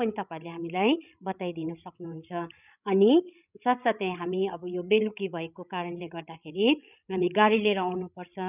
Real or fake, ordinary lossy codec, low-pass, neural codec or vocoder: fake; none; 3.6 kHz; codec, 16 kHz, 16 kbps, FreqCodec, larger model